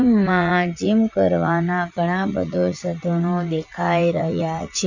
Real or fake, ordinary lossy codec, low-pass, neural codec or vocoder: fake; none; 7.2 kHz; vocoder, 44.1 kHz, 80 mel bands, Vocos